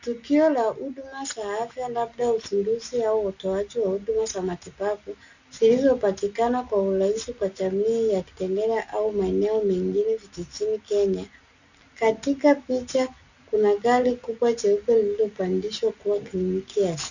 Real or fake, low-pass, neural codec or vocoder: real; 7.2 kHz; none